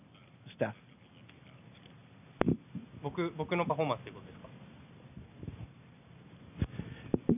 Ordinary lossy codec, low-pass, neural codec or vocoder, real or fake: none; 3.6 kHz; none; real